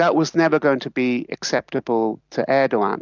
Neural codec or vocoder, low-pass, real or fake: none; 7.2 kHz; real